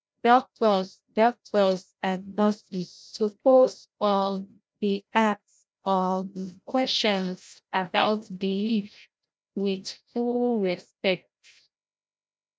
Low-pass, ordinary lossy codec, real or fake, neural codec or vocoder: none; none; fake; codec, 16 kHz, 0.5 kbps, FreqCodec, larger model